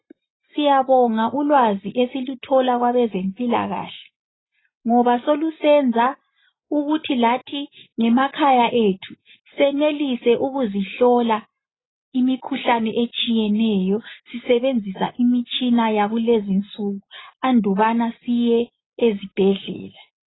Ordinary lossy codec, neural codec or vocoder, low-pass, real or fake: AAC, 16 kbps; none; 7.2 kHz; real